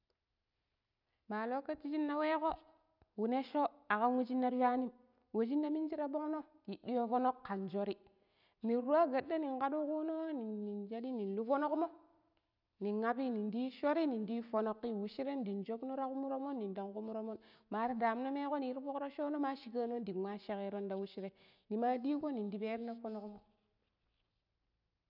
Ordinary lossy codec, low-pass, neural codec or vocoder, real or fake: none; 5.4 kHz; none; real